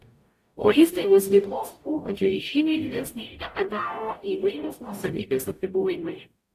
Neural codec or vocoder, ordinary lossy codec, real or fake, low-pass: codec, 44.1 kHz, 0.9 kbps, DAC; AAC, 64 kbps; fake; 14.4 kHz